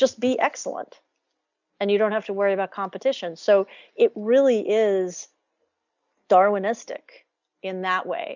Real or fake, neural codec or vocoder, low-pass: real; none; 7.2 kHz